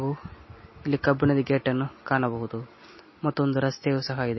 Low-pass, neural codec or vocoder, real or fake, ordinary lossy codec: 7.2 kHz; none; real; MP3, 24 kbps